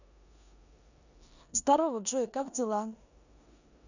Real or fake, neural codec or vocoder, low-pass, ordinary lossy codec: fake; codec, 16 kHz in and 24 kHz out, 0.9 kbps, LongCat-Audio-Codec, four codebook decoder; 7.2 kHz; none